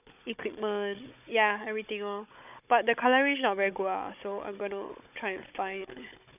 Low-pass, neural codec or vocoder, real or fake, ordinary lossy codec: 3.6 kHz; codec, 16 kHz, 16 kbps, FunCodec, trained on Chinese and English, 50 frames a second; fake; none